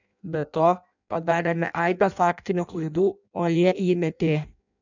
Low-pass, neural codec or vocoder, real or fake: 7.2 kHz; codec, 16 kHz in and 24 kHz out, 0.6 kbps, FireRedTTS-2 codec; fake